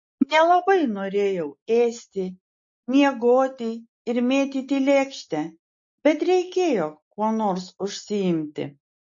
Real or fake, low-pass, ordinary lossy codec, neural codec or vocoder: real; 7.2 kHz; MP3, 32 kbps; none